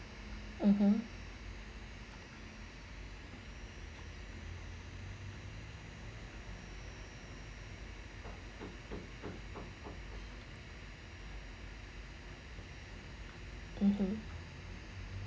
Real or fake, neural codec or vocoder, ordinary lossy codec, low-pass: real; none; none; none